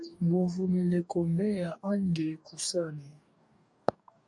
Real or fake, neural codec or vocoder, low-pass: fake; codec, 44.1 kHz, 2.6 kbps, DAC; 10.8 kHz